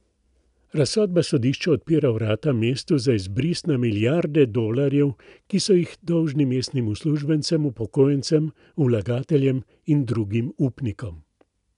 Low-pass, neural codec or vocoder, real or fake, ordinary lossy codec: 10.8 kHz; none; real; none